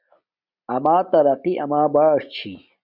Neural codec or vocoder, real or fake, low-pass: none; real; 5.4 kHz